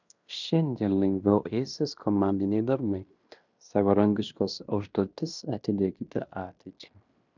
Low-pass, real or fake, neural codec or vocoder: 7.2 kHz; fake; codec, 16 kHz in and 24 kHz out, 0.9 kbps, LongCat-Audio-Codec, fine tuned four codebook decoder